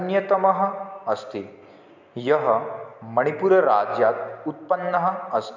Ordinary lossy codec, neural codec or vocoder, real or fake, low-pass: MP3, 64 kbps; none; real; 7.2 kHz